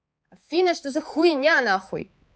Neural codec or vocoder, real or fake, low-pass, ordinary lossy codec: codec, 16 kHz, 4 kbps, X-Codec, HuBERT features, trained on balanced general audio; fake; none; none